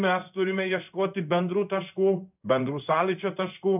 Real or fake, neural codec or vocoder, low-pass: fake; codec, 16 kHz in and 24 kHz out, 1 kbps, XY-Tokenizer; 3.6 kHz